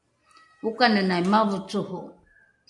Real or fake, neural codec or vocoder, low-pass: real; none; 10.8 kHz